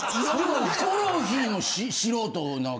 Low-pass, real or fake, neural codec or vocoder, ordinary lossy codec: none; real; none; none